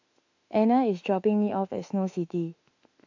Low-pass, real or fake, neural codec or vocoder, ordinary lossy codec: 7.2 kHz; fake; autoencoder, 48 kHz, 32 numbers a frame, DAC-VAE, trained on Japanese speech; AAC, 48 kbps